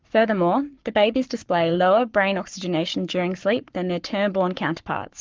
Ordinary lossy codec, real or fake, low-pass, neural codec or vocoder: Opus, 32 kbps; fake; 7.2 kHz; codec, 44.1 kHz, 7.8 kbps, Pupu-Codec